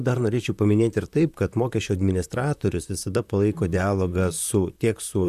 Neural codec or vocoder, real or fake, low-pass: vocoder, 44.1 kHz, 128 mel bands, Pupu-Vocoder; fake; 14.4 kHz